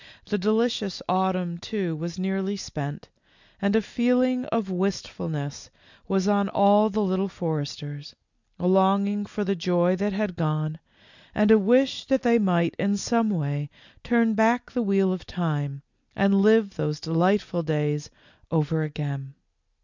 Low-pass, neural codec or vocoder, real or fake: 7.2 kHz; none; real